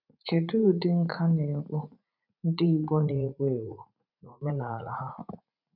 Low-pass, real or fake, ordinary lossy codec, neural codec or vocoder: 5.4 kHz; fake; none; vocoder, 44.1 kHz, 80 mel bands, Vocos